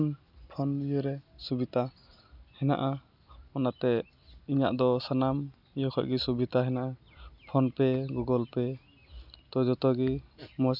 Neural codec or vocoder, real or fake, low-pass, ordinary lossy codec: none; real; 5.4 kHz; none